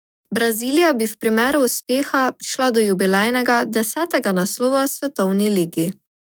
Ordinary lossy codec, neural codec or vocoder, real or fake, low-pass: none; codec, 44.1 kHz, 7.8 kbps, DAC; fake; none